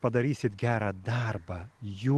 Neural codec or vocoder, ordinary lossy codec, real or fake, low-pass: none; Opus, 16 kbps; real; 10.8 kHz